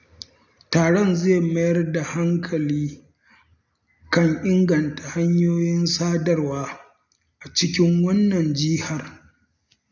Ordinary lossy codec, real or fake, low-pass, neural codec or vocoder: none; real; 7.2 kHz; none